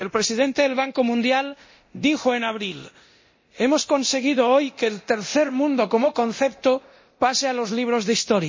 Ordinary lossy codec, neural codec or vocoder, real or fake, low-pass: MP3, 32 kbps; codec, 24 kHz, 0.9 kbps, DualCodec; fake; 7.2 kHz